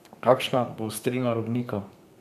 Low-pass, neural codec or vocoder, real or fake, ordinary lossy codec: 14.4 kHz; codec, 32 kHz, 1.9 kbps, SNAC; fake; none